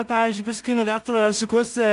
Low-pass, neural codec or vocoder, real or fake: 10.8 kHz; codec, 16 kHz in and 24 kHz out, 0.4 kbps, LongCat-Audio-Codec, two codebook decoder; fake